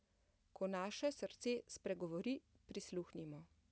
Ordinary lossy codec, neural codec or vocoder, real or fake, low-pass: none; none; real; none